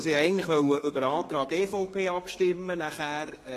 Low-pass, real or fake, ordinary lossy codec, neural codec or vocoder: 14.4 kHz; fake; AAC, 48 kbps; codec, 32 kHz, 1.9 kbps, SNAC